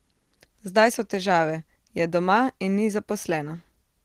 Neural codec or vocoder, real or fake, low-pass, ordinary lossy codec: none; real; 14.4 kHz; Opus, 16 kbps